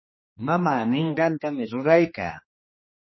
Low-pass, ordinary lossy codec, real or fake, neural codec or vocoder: 7.2 kHz; MP3, 24 kbps; fake; codec, 16 kHz, 2 kbps, X-Codec, HuBERT features, trained on general audio